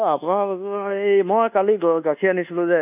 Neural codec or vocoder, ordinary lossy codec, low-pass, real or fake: codec, 24 kHz, 1.2 kbps, DualCodec; none; 3.6 kHz; fake